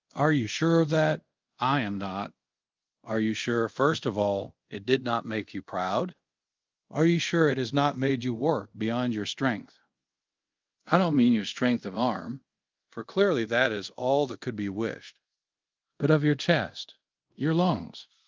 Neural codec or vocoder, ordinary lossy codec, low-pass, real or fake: codec, 24 kHz, 0.5 kbps, DualCodec; Opus, 32 kbps; 7.2 kHz; fake